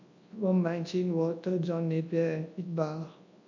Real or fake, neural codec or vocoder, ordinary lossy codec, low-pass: fake; codec, 24 kHz, 0.9 kbps, WavTokenizer, large speech release; MP3, 48 kbps; 7.2 kHz